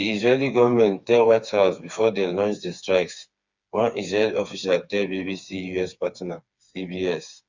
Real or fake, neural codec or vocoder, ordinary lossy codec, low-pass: fake; codec, 16 kHz, 4 kbps, FreqCodec, smaller model; Opus, 64 kbps; 7.2 kHz